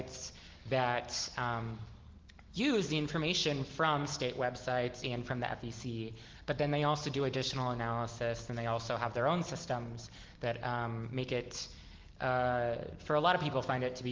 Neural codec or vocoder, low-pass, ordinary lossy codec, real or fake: none; 7.2 kHz; Opus, 16 kbps; real